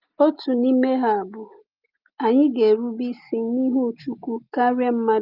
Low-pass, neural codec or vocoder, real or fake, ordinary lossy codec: 5.4 kHz; none; real; Opus, 24 kbps